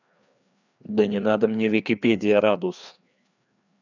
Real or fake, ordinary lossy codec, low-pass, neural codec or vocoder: fake; none; 7.2 kHz; codec, 16 kHz, 2 kbps, FreqCodec, larger model